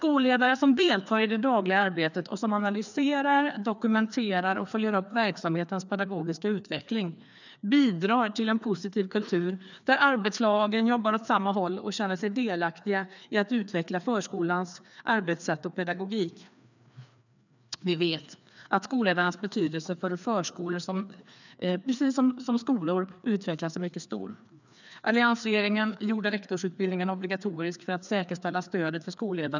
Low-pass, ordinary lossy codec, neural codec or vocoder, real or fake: 7.2 kHz; none; codec, 16 kHz, 2 kbps, FreqCodec, larger model; fake